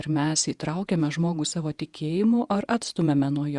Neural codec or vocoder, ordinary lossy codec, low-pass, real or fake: vocoder, 48 kHz, 128 mel bands, Vocos; Opus, 64 kbps; 10.8 kHz; fake